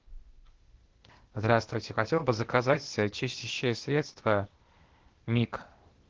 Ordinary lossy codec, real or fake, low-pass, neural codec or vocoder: Opus, 16 kbps; fake; 7.2 kHz; codec, 16 kHz, 0.8 kbps, ZipCodec